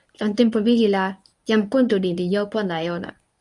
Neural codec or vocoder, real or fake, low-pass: codec, 24 kHz, 0.9 kbps, WavTokenizer, medium speech release version 1; fake; 10.8 kHz